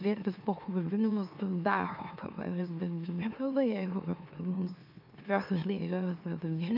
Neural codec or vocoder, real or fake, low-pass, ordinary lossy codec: autoencoder, 44.1 kHz, a latent of 192 numbers a frame, MeloTTS; fake; 5.4 kHz; AAC, 48 kbps